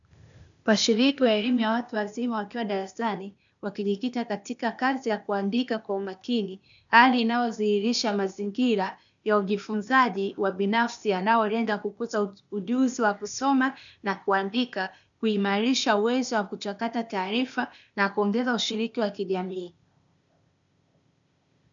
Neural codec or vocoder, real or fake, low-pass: codec, 16 kHz, 0.8 kbps, ZipCodec; fake; 7.2 kHz